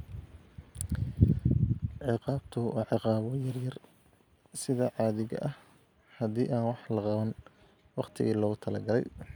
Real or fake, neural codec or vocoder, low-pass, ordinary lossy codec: real; none; none; none